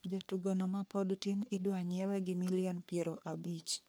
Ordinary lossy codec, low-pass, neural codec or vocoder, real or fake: none; none; codec, 44.1 kHz, 3.4 kbps, Pupu-Codec; fake